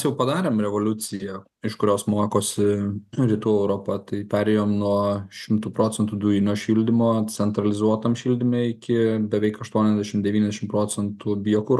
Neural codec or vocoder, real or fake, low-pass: none; real; 14.4 kHz